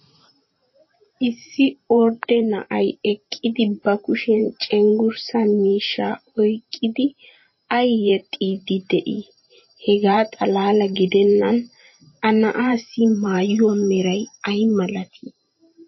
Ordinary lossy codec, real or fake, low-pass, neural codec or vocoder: MP3, 24 kbps; fake; 7.2 kHz; vocoder, 44.1 kHz, 128 mel bands every 512 samples, BigVGAN v2